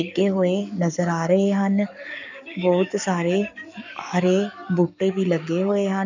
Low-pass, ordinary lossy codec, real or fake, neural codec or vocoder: 7.2 kHz; none; fake; codec, 44.1 kHz, 7.8 kbps, Pupu-Codec